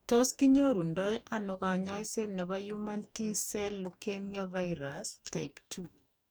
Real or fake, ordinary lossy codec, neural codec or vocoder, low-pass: fake; none; codec, 44.1 kHz, 2.6 kbps, DAC; none